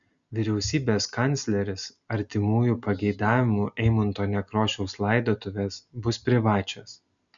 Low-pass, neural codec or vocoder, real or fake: 7.2 kHz; none; real